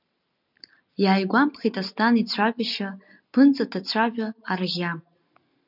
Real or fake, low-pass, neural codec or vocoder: real; 5.4 kHz; none